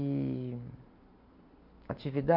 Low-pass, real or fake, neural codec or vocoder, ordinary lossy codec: 5.4 kHz; real; none; none